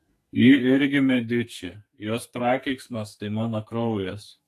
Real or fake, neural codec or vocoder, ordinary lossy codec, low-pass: fake; codec, 44.1 kHz, 2.6 kbps, SNAC; AAC, 64 kbps; 14.4 kHz